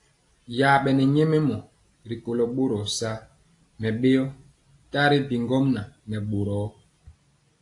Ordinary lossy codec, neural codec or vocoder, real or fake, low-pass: AAC, 48 kbps; none; real; 10.8 kHz